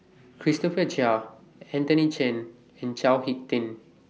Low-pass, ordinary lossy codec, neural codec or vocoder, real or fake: none; none; none; real